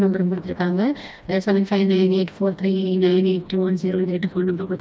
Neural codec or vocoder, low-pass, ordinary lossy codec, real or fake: codec, 16 kHz, 1 kbps, FreqCodec, smaller model; none; none; fake